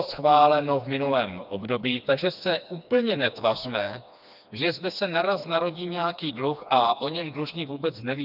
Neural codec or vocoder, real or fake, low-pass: codec, 16 kHz, 2 kbps, FreqCodec, smaller model; fake; 5.4 kHz